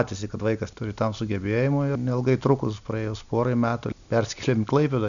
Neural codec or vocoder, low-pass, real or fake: none; 7.2 kHz; real